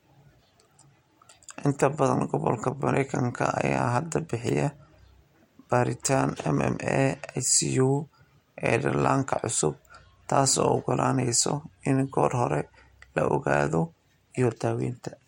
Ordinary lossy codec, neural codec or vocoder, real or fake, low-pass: MP3, 64 kbps; none; real; 19.8 kHz